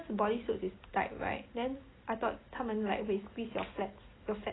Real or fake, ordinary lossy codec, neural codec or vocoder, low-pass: real; AAC, 16 kbps; none; 7.2 kHz